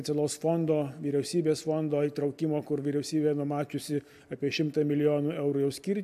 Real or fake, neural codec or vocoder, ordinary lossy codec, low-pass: real; none; AAC, 96 kbps; 14.4 kHz